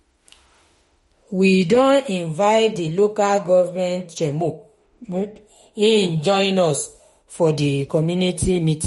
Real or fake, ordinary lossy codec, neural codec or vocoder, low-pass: fake; MP3, 48 kbps; autoencoder, 48 kHz, 32 numbers a frame, DAC-VAE, trained on Japanese speech; 19.8 kHz